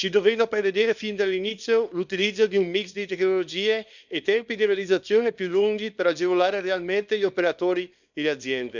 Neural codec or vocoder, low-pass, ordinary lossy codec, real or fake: codec, 24 kHz, 0.9 kbps, WavTokenizer, small release; 7.2 kHz; none; fake